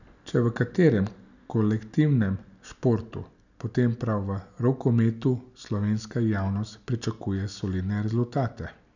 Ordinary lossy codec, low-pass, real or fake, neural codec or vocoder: none; 7.2 kHz; real; none